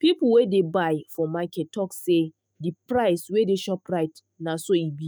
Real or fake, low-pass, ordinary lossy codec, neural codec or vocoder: fake; none; none; autoencoder, 48 kHz, 128 numbers a frame, DAC-VAE, trained on Japanese speech